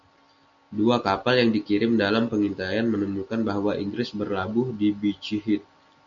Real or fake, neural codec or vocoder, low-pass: real; none; 7.2 kHz